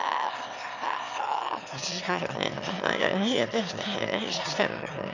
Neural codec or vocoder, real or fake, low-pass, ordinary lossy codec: autoencoder, 22.05 kHz, a latent of 192 numbers a frame, VITS, trained on one speaker; fake; 7.2 kHz; none